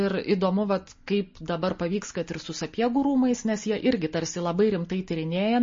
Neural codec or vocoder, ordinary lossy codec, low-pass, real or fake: none; MP3, 32 kbps; 7.2 kHz; real